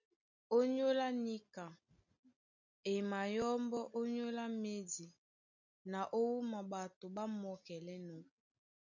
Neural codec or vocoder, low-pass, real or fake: none; 7.2 kHz; real